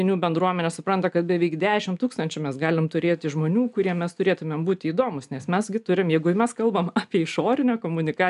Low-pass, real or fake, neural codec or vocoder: 10.8 kHz; real; none